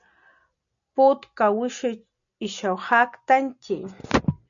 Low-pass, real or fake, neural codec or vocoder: 7.2 kHz; real; none